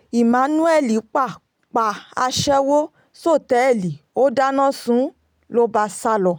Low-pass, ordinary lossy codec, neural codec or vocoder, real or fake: none; none; none; real